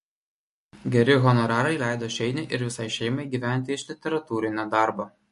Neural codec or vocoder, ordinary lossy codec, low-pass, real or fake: none; MP3, 48 kbps; 14.4 kHz; real